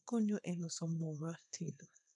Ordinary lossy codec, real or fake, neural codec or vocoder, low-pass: none; fake; codec, 24 kHz, 0.9 kbps, WavTokenizer, small release; 10.8 kHz